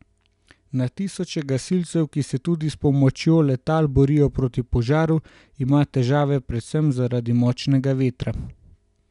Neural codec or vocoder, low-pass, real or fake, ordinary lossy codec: none; 10.8 kHz; real; none